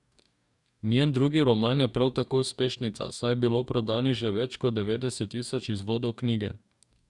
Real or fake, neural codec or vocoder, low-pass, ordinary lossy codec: fake; codec, 44.1 kHz, 2.6 kbps, DAC; 10.8 kHz; none